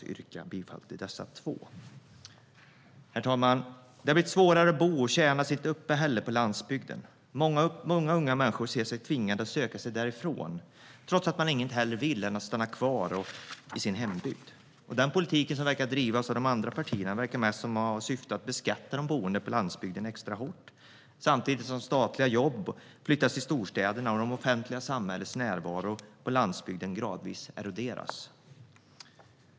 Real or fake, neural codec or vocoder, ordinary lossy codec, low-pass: real; none; none; none